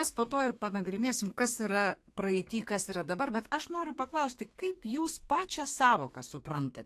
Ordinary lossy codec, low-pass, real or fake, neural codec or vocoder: AAC, 64 kbps; 14.4 kHz; fake; codec, 32 kHz, 1.9 kbps, SNAC